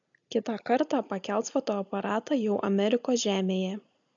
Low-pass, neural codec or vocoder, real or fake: 7.2 kHz; none; real